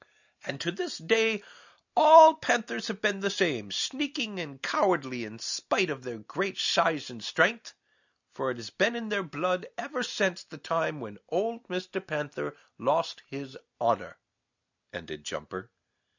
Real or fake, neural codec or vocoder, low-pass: real; none; 7.2 kHz